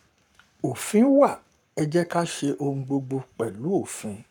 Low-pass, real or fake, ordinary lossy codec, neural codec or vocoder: 19.8 kHz; fake; none; codec, 44.1 kHz, 7.8 kbps, Pupu-Codec